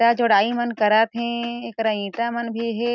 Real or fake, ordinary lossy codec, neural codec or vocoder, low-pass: real; none; none; none